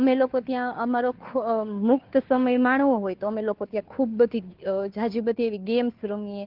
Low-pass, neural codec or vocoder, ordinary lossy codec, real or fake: 5.4 kHz; codec, 16 kHz, 2 kbps, FunCodec, trained on Chinese and English, 25 frames a second; Opus, 16 kbps; fake